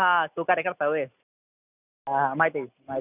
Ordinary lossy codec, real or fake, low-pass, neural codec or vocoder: none; real; 3.6 kHz; none